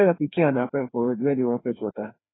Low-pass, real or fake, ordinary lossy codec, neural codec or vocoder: 7.2 kHz; fake; AAC, 16 kbps; codec, 16 kHz, 2 kbps, FreqCodec, larger model